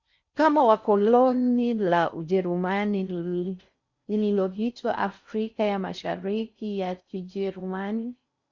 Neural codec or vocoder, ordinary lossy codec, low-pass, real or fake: codec, 16 kHz in and 24 kHz out, 0.6 kbps, FocalCodec, streaming, 4096 codes; Opus, 64 kbps; 7.2 kHz; fake